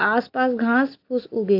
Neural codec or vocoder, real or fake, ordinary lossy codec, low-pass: none; real; AAC, 48 kbps; 5.4 kHz